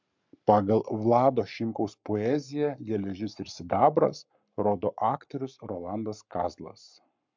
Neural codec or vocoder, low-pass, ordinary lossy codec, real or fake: codec, 44.1 kHz, 7.8 kbps, Pupu-Codec; 7.2 kHz; MP3, 64 kbps; fake